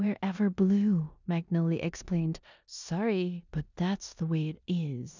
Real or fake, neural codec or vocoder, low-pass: fake; codec, 16 kHz in and 24 kHz out, 0.9 kbps, LongCat-Audio-Codec, four codebook decoder; 7.2 kHz